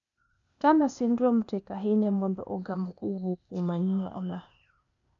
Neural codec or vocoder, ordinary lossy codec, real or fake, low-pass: codec, 16 kHz, 0.8 kbps, ZipCodec; none; fake; 7.2 kHz